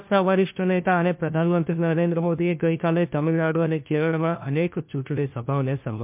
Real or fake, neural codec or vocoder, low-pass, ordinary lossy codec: fake; codec, 16 kHz, 1 kbps, FunCodec, trained on LibriTTS, 50 frames a second; 3.6 kHz; MP3, 32 kbps